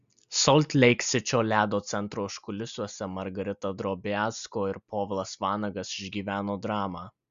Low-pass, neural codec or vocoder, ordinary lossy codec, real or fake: 7.2 kHz; none; Opus, 64 kbps; real